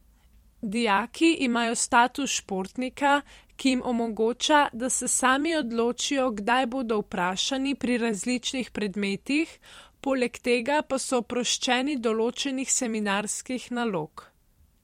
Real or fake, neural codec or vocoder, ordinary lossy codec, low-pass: fake; vocoder, 44.1 kHz, 128 mel bands every 256 samples, BigVGAN v2; MP3, 64 kbps; 19.8 kHz